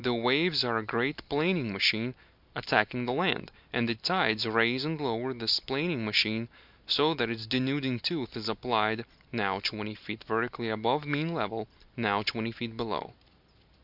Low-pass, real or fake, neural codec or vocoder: 5.4 kHz; real; none